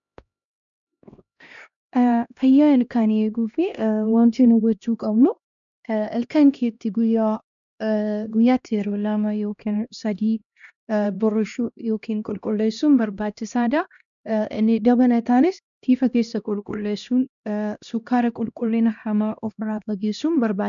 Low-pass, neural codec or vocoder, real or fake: 7.2 kHz; codec, 16 kHz, 1 kbps, X-Codec, HuBERT features, trained on LibriSpeech; fake